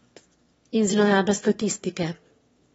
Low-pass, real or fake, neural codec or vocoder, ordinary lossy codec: 9.9 kHz; fake; autoencoder, 22.05 kHz, a latent of 192 numbers a frame, VITS, trained on one speaker; AAC, 24 kbps